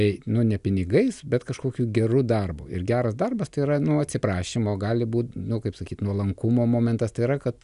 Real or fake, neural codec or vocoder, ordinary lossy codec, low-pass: real; none; MP3, 96 kbps; 10.8 kHz